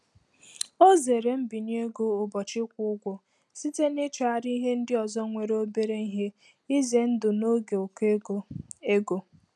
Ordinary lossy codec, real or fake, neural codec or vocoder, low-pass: none; real; none; none